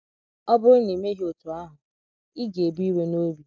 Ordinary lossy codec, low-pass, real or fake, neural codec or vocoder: none; none; real; none